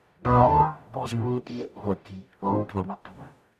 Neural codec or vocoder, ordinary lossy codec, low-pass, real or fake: codec, 44.1 kHz, 0.9 kbps, DAC; none; 14.4 kHz; fake